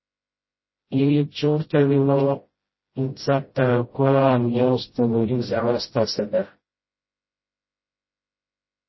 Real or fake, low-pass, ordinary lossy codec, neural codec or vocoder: fake; 7.2 kHz; MP3, 24 kbps; codec, 16 kHz, 0.5 kbps, FreqCodec, smaller model